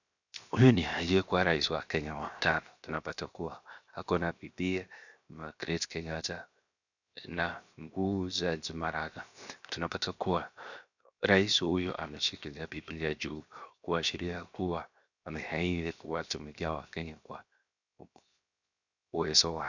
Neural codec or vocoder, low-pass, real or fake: codec, 16 kHz, 0.7 kbps, FocalCodec; 7.2 kHz; fake